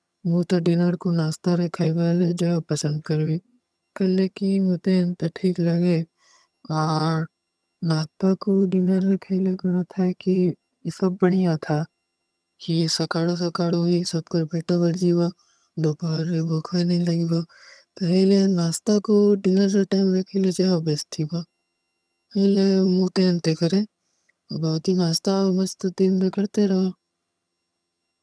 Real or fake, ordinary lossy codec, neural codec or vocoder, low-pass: fake; none; vocoder, 22.05 kHz, 80 mel bands, HiFi-GAN; none